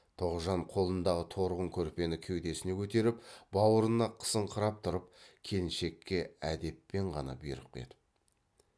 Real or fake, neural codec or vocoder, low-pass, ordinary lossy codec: real; none; none; none